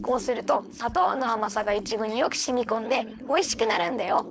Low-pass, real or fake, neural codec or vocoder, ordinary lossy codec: none; fake; codec, 16 kHz, 4.8 kbps, FACodec; none